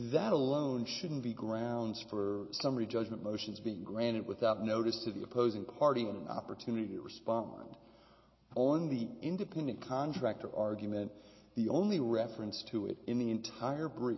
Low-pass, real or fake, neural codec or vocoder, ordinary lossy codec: 7.2 kHz; real; none; MP3, 24 kbps